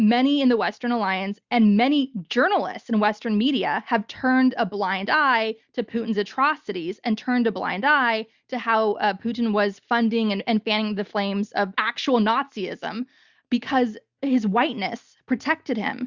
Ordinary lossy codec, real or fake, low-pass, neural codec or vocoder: Opus, 64 kbps; real; 7.2 kHz; none